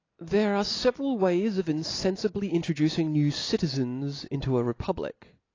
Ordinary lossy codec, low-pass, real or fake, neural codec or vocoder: AAC, 32 kbps; 7.2 kHz; real; none